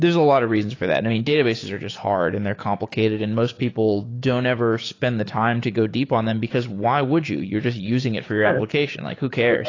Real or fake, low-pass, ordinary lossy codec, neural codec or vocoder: fake; 7.2 kHz; AAC, 32 kbps; codec, 16 kHz, 6 kbps, DAC